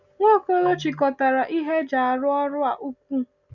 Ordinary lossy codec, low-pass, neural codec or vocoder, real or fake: none; 7.2 kHz; none; real